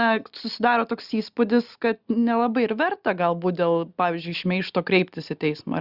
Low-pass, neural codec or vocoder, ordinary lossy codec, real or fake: 5.4 kHz; none; Opus, 64 kbps; real